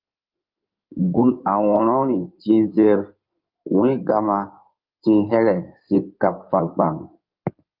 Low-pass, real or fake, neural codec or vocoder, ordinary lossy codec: 5.4 kHz; fake; codec, 16 kHz in and 24 kHz out, 2.2 kbps, FireRedTTS-2 codec; Opus, 24 kbps